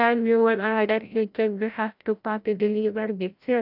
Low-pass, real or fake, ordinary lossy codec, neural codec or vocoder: 5.4 kHz; fake; none; codec, 16 kHz, 0.5 kbps, FreqCodec, larger model